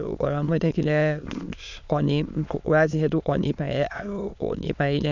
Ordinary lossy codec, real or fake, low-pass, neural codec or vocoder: none; fake; 7.2 kHz; autoencoder, 22.05 kHz, a latent of 192 numbers a frame, VITS, trained on many speakers